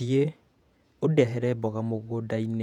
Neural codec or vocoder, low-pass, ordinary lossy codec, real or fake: vocoder, 48 kHz, 128 mel bands, Vocos; 19.8 kHz; none; fake